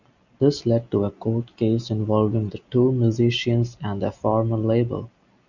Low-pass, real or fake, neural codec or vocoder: 7.2 kHz; real; none